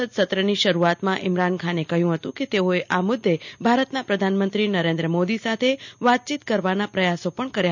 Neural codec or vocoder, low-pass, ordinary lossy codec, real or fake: none; 7.2 kHz; none; real